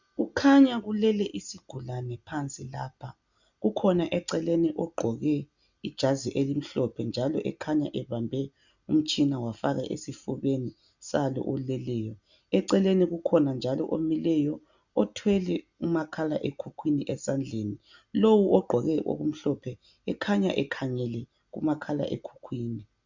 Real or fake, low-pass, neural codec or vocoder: real; 7.2 kHz; none